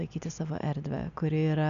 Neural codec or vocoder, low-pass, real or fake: none; 7.2 kHz; real